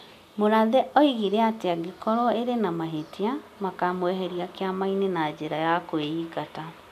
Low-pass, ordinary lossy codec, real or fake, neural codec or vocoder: 14.4 kHz; none; real; none